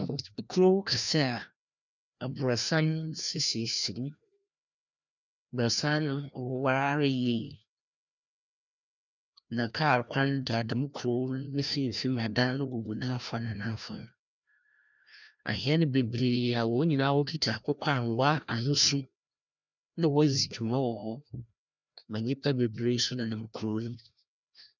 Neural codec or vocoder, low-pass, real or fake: codec, 16 kHz, 1 kbps, FreqCodec, larger model; 7.2 kHz; fake